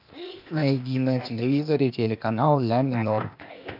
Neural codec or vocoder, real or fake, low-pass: codec, 16 kHz, 0.8 kbps, ZipCodec; fake; 5.4 kHz